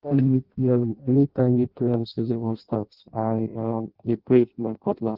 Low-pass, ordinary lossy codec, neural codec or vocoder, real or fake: 5.4 kHz; Opus, 16 kbps; codec, 16 kHz in and 24 kHz out, 0.6 kbps, FireRedTTS-2 codec; fake